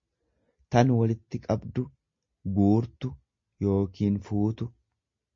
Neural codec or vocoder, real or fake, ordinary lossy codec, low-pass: none; real; MP3, 32 kbps; 7.2 kHz